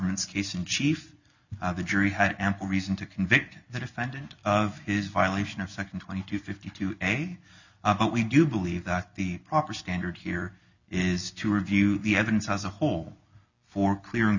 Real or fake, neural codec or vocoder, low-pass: real; none; 7.2 kHz